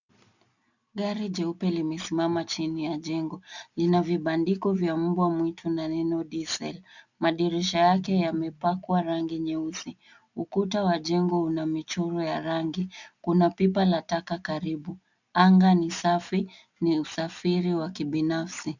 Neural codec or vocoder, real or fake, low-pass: none; real; 7.2 kHz